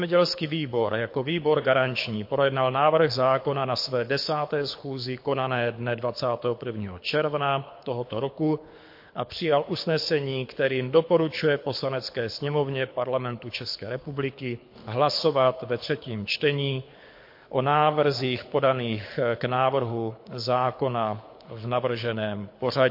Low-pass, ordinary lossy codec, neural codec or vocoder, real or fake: 5.4 kHz; MP3, 32 kbps; codec, 24 kHz, 6 kbps, HILCodec; fake